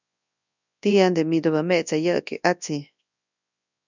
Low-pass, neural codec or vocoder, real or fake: 7.2 kHz; codec, 24 kHz, 0.9 kbps, WavTokenizer, large speech release; fake